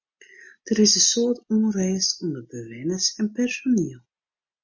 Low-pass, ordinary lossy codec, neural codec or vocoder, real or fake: 7.2 kHz; MP3, 32 kbps; none; real